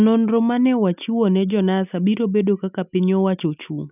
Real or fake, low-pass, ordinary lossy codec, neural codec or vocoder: real; 3.6 kHz; none; none